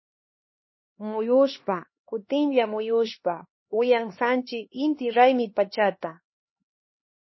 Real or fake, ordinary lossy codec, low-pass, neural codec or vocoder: fake; MP3, 24 kbps; 7.2 kHz; codec, 16 kHz, 2 kbps, X-Codec, HuBERT features, trained on LibriSpeech